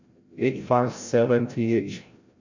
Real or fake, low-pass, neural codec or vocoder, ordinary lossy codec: fake; 7.2 kHz; codec, 16 kHz, 0.5 kbps, FreqCodec, larger model; Opus, 64 kbps